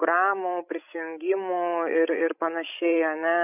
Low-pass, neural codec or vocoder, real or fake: 3.6 kHz; codec, 16 kHz, 16 kbps, FreqCodec, larger model; fake